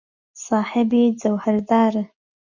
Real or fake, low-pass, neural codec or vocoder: real; 7.2 kHz; none